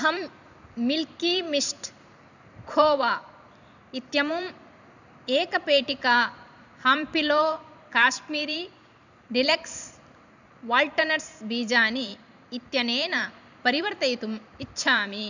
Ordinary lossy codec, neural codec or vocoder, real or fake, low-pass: none; vocoder, 44.1 kHz, 128 mel bands every 512 samples, BigVGAN v2; fake; 7.2 kHz